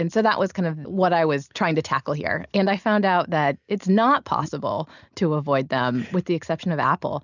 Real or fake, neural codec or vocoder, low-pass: real; none; 7.2 kHz